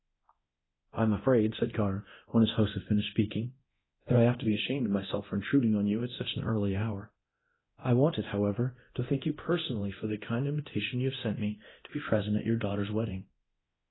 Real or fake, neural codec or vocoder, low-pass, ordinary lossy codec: fake; codec, 24 kHz, 0.9 kbps, DualCodec; 7.2 kHz; AAC, 16 kbps